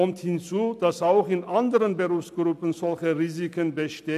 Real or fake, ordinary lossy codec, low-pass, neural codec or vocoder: real; none; 14.4 kHz; none